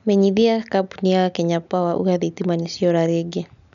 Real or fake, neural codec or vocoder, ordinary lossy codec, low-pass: real; none; none; 7.2 kHz